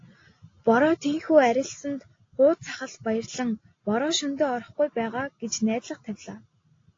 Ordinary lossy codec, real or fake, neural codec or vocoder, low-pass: AAC, 32 kbps; real; none; 7.2 kHz